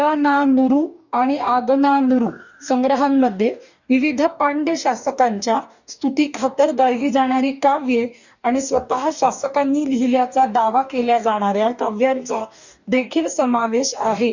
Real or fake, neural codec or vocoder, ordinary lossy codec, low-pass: fake; codec, 44.1 kHz, 2.6 kbps, DAC; none; 7.2 kHz